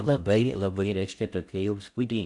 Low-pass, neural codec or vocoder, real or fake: 10.8 kHz; codec, 16 kHz in and 24 kHz out, 0.6 kbps, FocalCodec, streaming, 4096 codes; fake